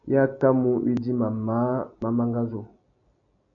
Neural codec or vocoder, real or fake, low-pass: none; real; 7.2 kHz